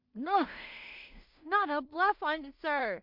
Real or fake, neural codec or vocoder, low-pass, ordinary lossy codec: fake; codec, 16 kHz in and 24 kHz out, 0.4 kbps, LongCat-Audio-Codec, two codebook decoder; 5.4 kHz; MP3, 48 kbps